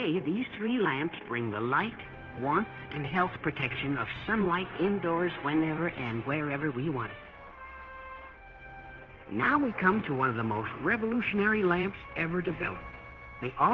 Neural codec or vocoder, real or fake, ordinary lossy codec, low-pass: codec, 16 kHz, 0.9 kbps, LongCat-Audio-Codec; fake; Opus, 16 kbps; 7.2 kHz